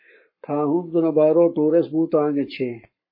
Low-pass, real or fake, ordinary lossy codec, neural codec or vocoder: 5.4 kHz; fake; MP3, 24 kbps; codec, 44.1 kHz, 7.8 kbps, Pupu-Codec